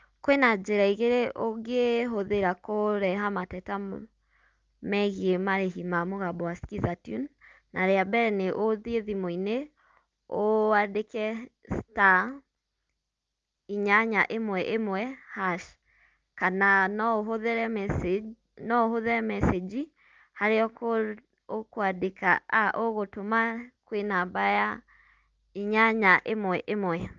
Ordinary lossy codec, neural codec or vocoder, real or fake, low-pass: Opus, 24 kbps; none; real; 7.2 kHz